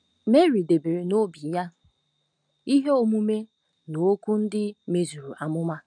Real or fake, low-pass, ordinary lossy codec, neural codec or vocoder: real; 9.9 kHz; none; none